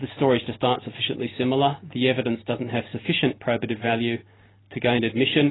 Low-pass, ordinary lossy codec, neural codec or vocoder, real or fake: 7.2 kHz; AAC, 16 kbps; none; real